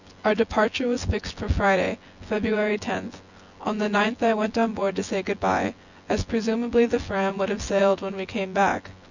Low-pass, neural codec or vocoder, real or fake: 7.2 kHz; vocoder, 24 kHz, 100 mel bands, Vocos; fake